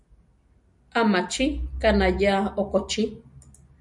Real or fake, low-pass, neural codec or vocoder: real; 10.8 kHz; none